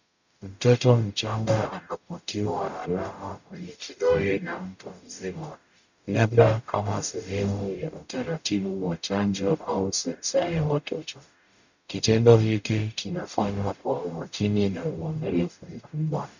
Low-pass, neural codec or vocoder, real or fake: 7.2 kHz; codec, 44.1 kHz, 0.9 kbps, DAC; fake